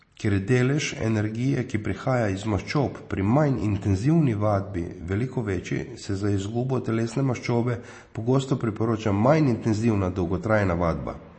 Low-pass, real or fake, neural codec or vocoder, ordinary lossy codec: 9.9 kHz; real; none; MP3, 32 kbps